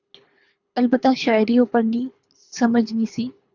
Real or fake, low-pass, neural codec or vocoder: fake; 7.2 kHz; codec, 24 kHz, 3 kbps, HILCodec